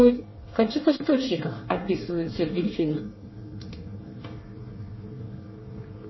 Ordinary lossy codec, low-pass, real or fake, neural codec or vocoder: MP3, 24 kbps; 7.2 kHz; fake; codec, 24 kHz, 1 kbps, SNAC